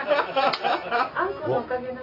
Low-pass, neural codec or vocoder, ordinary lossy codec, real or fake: 5.4 kHz; none; AAC, 24 kbps; real